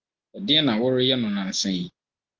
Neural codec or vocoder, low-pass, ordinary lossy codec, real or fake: none; 7.2 kHz; Opus, 16 kbps; real